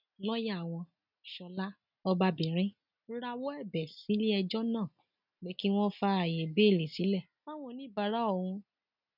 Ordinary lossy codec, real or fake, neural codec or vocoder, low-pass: none; real; none; 5.4 kHz